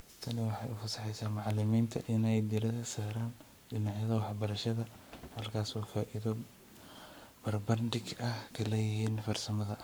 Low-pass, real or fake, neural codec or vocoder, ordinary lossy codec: none; fake; codec, 44.1 kHz, 7.8 kbps, Pupu-Codec; none